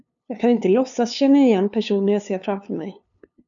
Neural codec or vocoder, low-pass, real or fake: codec, 16 kHz, 2 kbps, FunCodec, trained on LibriTTS, 25 frames a second; 7.2 kHz; fake